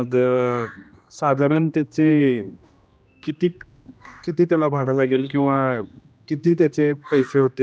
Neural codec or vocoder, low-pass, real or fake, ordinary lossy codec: codec, 16 kHz, 1 kbps, X-Codec, HuBERT features, trained on general audio; none; fake; none